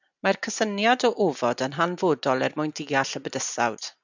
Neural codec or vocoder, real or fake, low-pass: none; real; 7.2 kHz